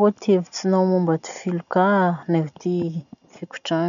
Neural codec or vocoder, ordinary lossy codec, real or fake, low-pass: none; none; real; 7.2 kHz